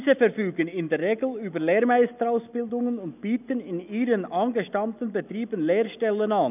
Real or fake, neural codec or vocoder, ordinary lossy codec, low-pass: real; none; none; 3.6 kHz